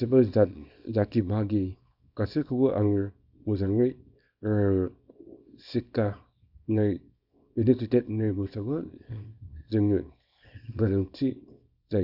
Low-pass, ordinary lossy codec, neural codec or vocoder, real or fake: 5.4 kHz; none; codec, 24 kHz, 0.9 kbps, WavTokenizer, small release; fake